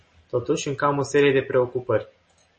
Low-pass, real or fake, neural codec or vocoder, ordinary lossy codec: 10.8 kHz; real; none; MP3, 32 kbps